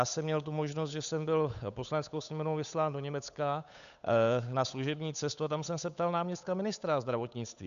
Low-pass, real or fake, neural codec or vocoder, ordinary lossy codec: 7.2 kHz; fake; codec, 16 kHz, 8 kbps, FunCodec, trained on Chinese and English, 25 frames a second; MP3, 96 kbps